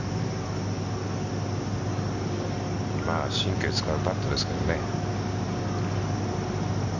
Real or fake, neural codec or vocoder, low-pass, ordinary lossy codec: real; none; 7.2 kHz; Opus, 64 kbps